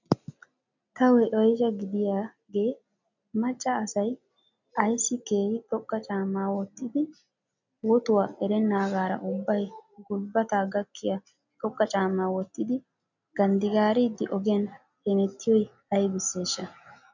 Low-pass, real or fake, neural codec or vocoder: 7.2 kHz; real; none